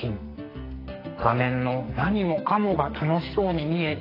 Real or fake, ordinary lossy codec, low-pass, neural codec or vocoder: fake; AAC, 24 kbps; 5.4 kHz; codec, 44.1 kHz, 2.6 kbps, SNAC